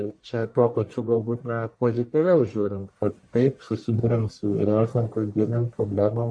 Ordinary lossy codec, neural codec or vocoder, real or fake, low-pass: AAC, 64 kbps; codec, 44.1 kHz, 1.7 kbps, Pupu-Codec; fake; 9.9 kHz